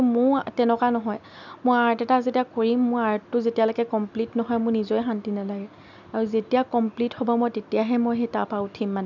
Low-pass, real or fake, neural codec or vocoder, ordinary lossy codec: 7.2 kHz; real; none; none